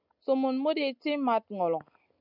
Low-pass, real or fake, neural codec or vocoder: 5.4 kHz; real; none